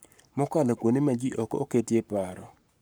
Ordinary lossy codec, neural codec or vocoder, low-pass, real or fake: none; codec, 44.1 kHz, 7.8 kbps, Pupu-Codec; none; fake